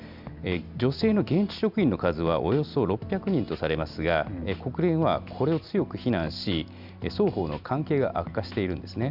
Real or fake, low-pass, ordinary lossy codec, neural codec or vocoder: real; 5.4 kHz; none; none